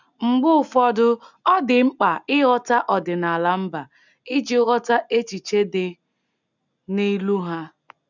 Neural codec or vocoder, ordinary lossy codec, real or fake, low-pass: none; none; real; 7.2 kHz